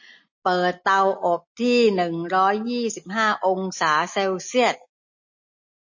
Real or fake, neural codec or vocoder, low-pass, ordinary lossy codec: real; none; 7.2 kHz; MP3, 32 kbps